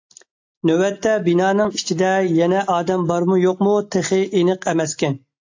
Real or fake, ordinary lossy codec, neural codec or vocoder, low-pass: real; AAC, 48 kbps; none; 7.2 kHz